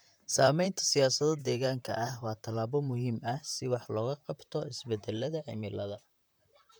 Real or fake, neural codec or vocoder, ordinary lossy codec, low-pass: fake; vocoder, 44.1 kHz, 128 mel bands, Pupu-Vocoder; none; none